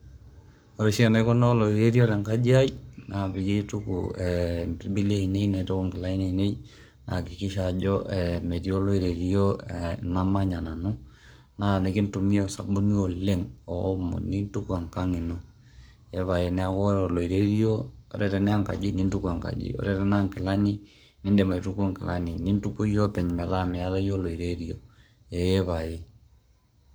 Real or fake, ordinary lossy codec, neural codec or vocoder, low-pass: fake; none; codec, 44.1 kHz, 7.8 kbps, Pupu-Codec; none